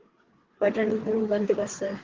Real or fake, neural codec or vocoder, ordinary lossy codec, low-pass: fake; codec, 16 kHz, 4 kbps, FreqCodec, larger model; Opus, 16 kbps; 7.2 kHz